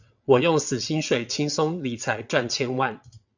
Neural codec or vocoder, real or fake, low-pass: vocoder, 22.05 kHz, 80 mel bands, WaveNeXt; fake; 7.2 kHz